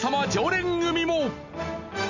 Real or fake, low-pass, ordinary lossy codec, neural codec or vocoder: real; 7.2 kHz; none; none